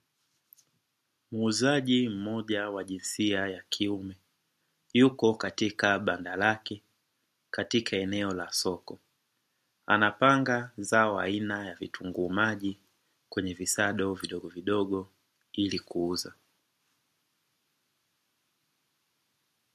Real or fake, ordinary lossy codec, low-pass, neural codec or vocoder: real; MP3, 64 kbps; 14.4 kHz; none